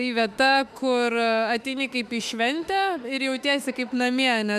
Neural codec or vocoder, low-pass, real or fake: autoencoder, 48 kHz, 32 numbers a frame, DAC-VAE, trained on Japanese speech; 14.4 kHz; fake